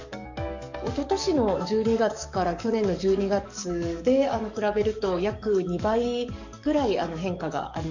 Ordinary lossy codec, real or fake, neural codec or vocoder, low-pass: none; fake; codec, 44.1 kHz, 7.8 kbps, DAC; 7.2 kHz